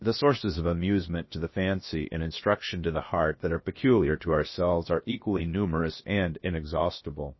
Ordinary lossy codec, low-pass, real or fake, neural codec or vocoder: MP3, 24 kbps; 7.2 kHz; fake; codec, 16 kHz, about 1 kbps, DyCAST, with the encoder's durations